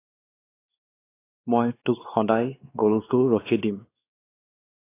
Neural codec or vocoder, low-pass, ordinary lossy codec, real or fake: codec, 16 kHz, 2 kbps, X-Codec, WavLM features, trained on Multilingual LibriSpeech; 3.6 kHz; AAC, 24 kbps; fake